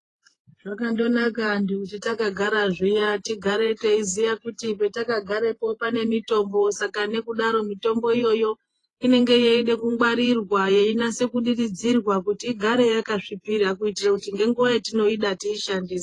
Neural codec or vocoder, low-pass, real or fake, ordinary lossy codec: vocoder, 48 kHz, 128 mel bands, Vocos; 10.8 kHz; fake; AAC, 32 kbps